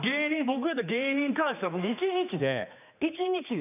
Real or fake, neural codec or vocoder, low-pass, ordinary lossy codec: fake; codec, 16 kHz, 2 kbps, X-Codec, HuBERT features, trained on general audio; 3.6 kHz; none